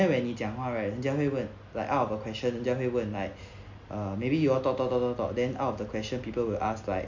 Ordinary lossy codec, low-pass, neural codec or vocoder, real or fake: MP3, 48 kbps; 7.2 kHz; none; real